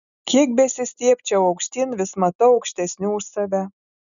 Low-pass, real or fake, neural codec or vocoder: 7.2 kHz; real; none